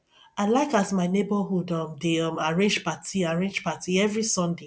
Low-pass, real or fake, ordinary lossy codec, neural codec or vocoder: none; real; none; none